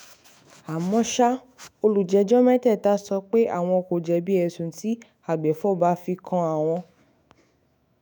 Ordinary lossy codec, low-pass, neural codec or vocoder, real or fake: none; none; autoencoder, 48 kHz, 128 numbers a frame, DAC-VAE, trained on Japanese speech; fake